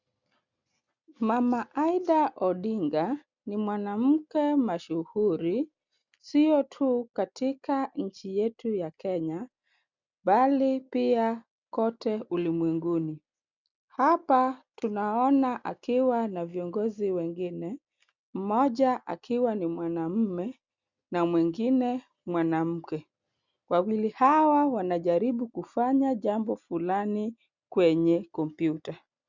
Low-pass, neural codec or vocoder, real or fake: 7.2 kHz; none; real